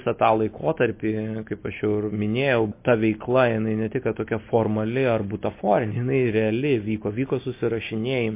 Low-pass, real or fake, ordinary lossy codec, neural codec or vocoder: 3.6 kHz; real; MP3, 24 kbps; none